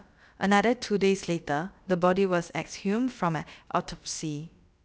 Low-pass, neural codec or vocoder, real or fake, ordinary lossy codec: none; codec, 16 kHz, about 1 kbps, DyCAST, with the encoder's durations; fake; none